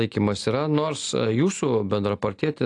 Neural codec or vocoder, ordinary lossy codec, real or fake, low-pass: none; AAC, 48 kbps; real; 10.8 kHz